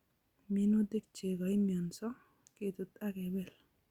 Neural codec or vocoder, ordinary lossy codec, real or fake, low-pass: none; Opus, 64 kbps; real; 19.8 kHz